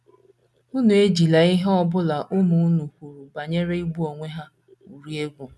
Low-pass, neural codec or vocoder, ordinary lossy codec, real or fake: none; none; none; real